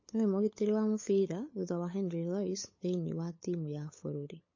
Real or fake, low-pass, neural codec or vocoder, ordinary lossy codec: fake; 7.2 kHz; codec, 16 kHz, 8 kbps, FunCodec, trained on LibriTTS, 25 frames a second; MP3, 32 kbps